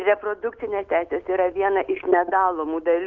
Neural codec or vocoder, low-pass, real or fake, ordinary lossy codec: none; 7.2 kHz; real; Opus, 24 kbps